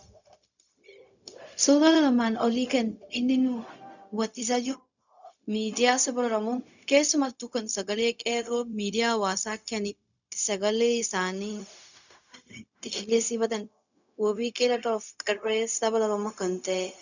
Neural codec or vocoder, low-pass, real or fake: codec, 16 kHz, 0.4 kbps, LongCat-Audio-Codec; 7.2 kHz; fake